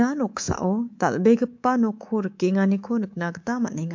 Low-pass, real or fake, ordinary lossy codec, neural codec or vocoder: 7.2 kHz; fake; MP3, 48 kbps; codec, 24 kHz, 3.1 kbps, DualCodec